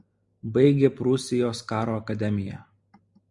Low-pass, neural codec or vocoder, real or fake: 10.8 kHz; none; real